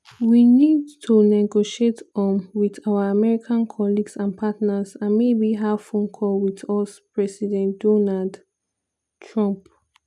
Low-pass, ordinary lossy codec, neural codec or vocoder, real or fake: none; none; none; real